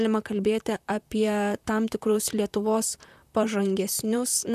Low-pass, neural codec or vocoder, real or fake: 14.4 kHz; vocoder, 44.1 kHz, 128 mel bands, Pupu-Vocoder; fake